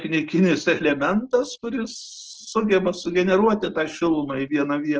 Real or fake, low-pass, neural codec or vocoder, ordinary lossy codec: real; 7.2 kHz; none; Opus, 16 kbps